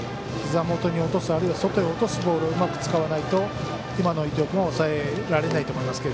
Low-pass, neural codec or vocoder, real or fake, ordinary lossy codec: none; none; real; none